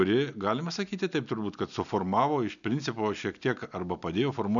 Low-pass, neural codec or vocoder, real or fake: 7.2 kHz; none; real